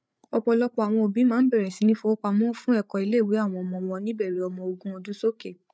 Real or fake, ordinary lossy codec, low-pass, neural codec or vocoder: fake; none; none; codec, 16 kHz, 8 kbps, FreqCodec, larger model